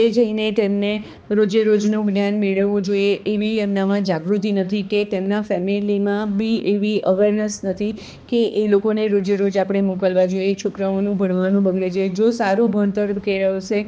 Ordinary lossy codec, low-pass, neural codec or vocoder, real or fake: none; none; codec, 16 kHz, 2 kbps, X-Codec, HuBERT features, trained on balanced general audio; fake